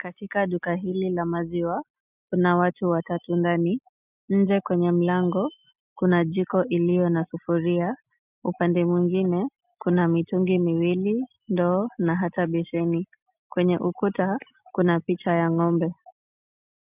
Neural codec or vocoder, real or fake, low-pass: none; real; 3.6 kHz